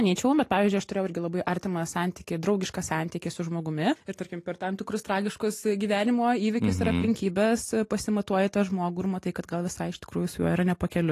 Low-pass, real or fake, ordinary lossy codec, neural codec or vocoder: 14.4 kHz; real; AAC, 48 kbps; none